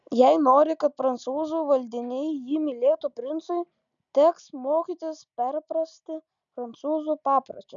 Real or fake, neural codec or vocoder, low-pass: real; none; 7.2 kHz